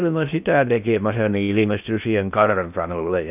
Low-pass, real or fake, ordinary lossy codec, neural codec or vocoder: 3.6 kHz; fake; none; codec, 16 kHz in and 24 kHz out, 0.6 kbps, FocalCodec, streaming, 2048 codes